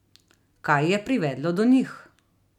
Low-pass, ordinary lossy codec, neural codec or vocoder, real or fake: 19.8 kHz; none; none; real